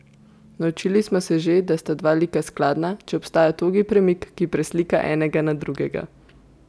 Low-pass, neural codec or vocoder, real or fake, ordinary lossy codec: none; none; real; none